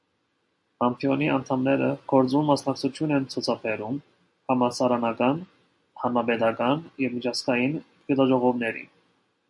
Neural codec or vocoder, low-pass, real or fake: none; 10.8 kHz; real